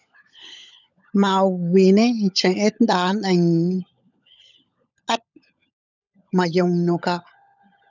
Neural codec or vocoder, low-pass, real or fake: codec, 16 kHz, 16 kbps, FunCodec, trained on LibriTTS, 50 frames a second; 7.2 kHz; fake